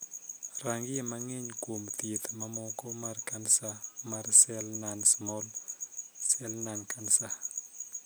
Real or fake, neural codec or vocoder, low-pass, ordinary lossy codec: real; none; none; none